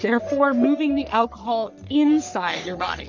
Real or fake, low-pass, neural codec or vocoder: fake; 7.2 kHz; codec, 44.1 kHz, 3.4 kbps, Pupu-Codec